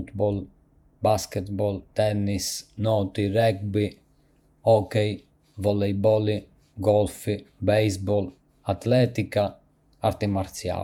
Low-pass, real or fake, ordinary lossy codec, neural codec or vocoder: 19.8 kHz; fake; none; vocoder, 48 kHz, 128 mel bands, Vocos